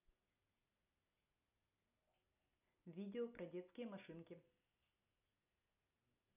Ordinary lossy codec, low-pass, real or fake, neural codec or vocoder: none; 3.6 kHz; real; none